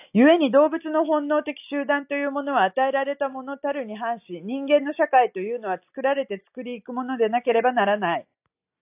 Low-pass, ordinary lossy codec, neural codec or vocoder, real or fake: 3.6 kHz; AAC, 32 kbps; none; real